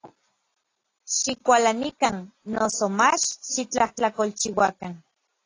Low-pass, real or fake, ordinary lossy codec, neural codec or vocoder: 7.2 kHz; real; AAC, 32 kbps; none